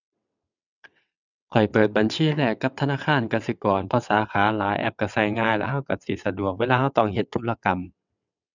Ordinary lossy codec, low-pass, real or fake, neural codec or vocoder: none; 7.2 kHz; fake; vocoder, 22.05 kHz, 80 mel bands, WaveNeXt